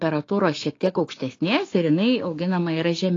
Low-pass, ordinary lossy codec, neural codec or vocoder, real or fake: 7.2 kHz; AAC, 32 kbps; codec, 16 kHz, 6 kbps, DAC; fake